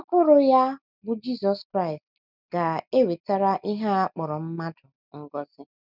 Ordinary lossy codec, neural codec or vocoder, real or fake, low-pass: none; none; real; 5.4 kHz